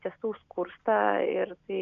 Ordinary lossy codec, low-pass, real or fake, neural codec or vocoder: AAC, 96 kbps; 7.2 kHz; real; none